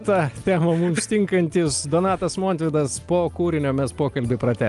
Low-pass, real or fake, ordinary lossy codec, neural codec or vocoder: 10.8 kHz; real; Opus, 32 kbps; none